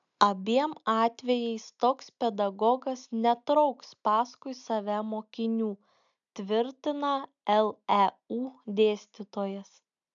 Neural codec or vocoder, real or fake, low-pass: none; real; 7.2 kHz